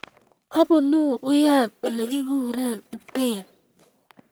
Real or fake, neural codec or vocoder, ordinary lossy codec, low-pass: fake; codec, 44.1 kHz, 1.7 kbps, Pupu-Codec; none; none